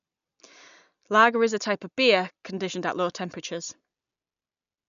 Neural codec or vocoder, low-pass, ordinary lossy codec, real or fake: none; 7.2 kHz; none; real